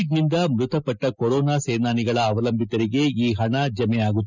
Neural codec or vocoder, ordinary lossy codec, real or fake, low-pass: none; none; real; 7.2 kHz